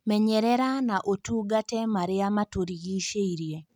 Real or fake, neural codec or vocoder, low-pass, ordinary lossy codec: real; none; 19.8 kHz; none